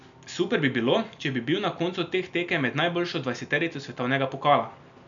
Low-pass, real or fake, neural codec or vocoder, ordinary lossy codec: 7.2 kHz; real; none; none